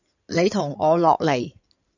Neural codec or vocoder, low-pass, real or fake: codec, 16 kHz in and 24 kHz out, 2.2 kbps, FireRedTTS-2 codec; 7.2 kHz; fake